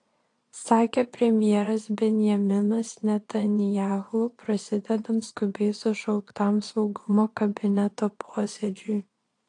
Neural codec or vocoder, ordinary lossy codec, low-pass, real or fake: vocoder, 22.05 kHz, 80 mel bands, Vocos; AAC, 48 kbps; 9.9 kHz; fake